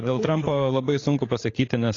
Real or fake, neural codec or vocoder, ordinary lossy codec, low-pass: fake; codec, 16 kHz, 8 kbps, FreqCodec, larger model; AAC, 32 kbps; 7.2 kHz